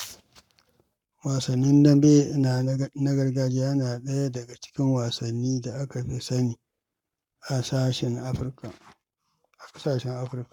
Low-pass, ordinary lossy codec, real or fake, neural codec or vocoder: 19.8 kHz; none; fake; codec, 44.1 kHz, 7.8 kbps, Pupu-Codec